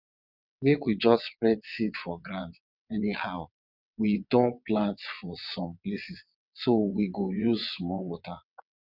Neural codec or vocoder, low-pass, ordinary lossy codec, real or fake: vocoder, 22.05 kHz, 80 mel bands, WaveNeXt; 5.4 kHz; none; fake